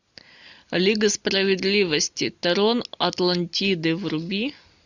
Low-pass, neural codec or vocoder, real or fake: 7.2 kHz; none; real